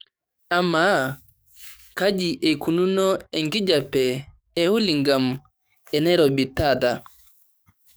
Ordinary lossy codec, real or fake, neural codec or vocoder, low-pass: none; fake; codec, 44.1 kHz, 7.8 kbps, DAC; none